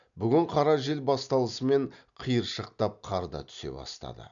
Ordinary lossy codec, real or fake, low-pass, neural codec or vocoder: none; real; 7.2 kHz; none